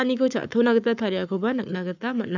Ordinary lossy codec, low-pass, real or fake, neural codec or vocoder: none; 7.2 kHz; fake; codec, 44.1 kHz, 7.8 kbps, Pupu-Codec